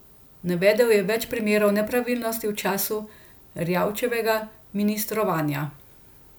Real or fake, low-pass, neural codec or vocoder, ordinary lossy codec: real; none; none; none